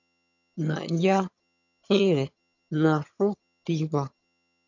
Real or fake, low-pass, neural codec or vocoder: fake; 7.2 kHz; vocoder, 22.05 kHz, 80 mel bands, HiFi-GAN